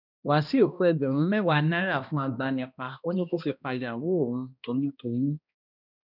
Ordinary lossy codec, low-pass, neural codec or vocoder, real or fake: none; 5.4 kHz; codec, 16 kHz, 1 kbps, X-Codec, HuBERT features, trained on balanced general audio; fake